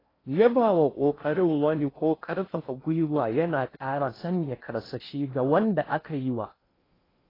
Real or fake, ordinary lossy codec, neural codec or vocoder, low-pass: fake; AAC, 24 kbps; codec, 16 kHz in and 24 kHz out, 0.6 kbps, FocalCodec, streaming, 4096 codes; 5.4 kHz